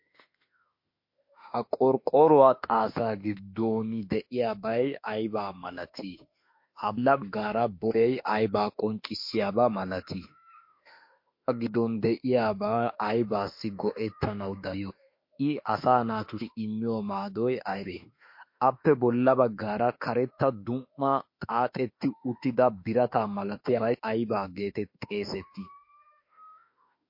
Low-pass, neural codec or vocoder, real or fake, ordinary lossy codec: 5.4 kHz; autoencoder, 48 kHz, 32 numbers a frame, DAC-VAE, trained on Japanese speech; fake; MP3, 32 kbps